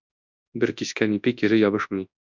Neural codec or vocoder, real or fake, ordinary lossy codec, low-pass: codec, 24 kHz, 0.9 kbps, WavTokenizer, large speech release; fake; MP3, 64 kbps; 7.2 kHz